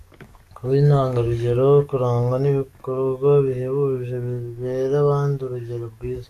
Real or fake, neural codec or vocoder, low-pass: fake; codec, 44.1 kHz, 7.8 kbps, DAC; 14.4 kHz